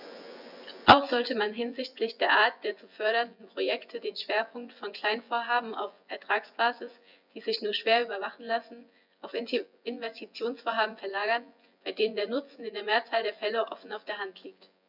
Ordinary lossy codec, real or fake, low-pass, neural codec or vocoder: none; fake; 5.4 kHz; vocoder, 24 kHz, 100 mel bands, Vocos